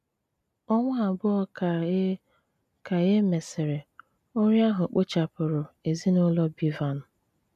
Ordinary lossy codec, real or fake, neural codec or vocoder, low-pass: none; real; none; 14.4 kHz